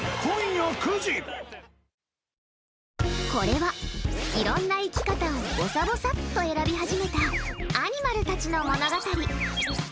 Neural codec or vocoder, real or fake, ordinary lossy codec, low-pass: none; real; none; none